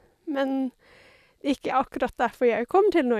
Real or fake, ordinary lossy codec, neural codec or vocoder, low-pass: real; none; none; 14.4 kHz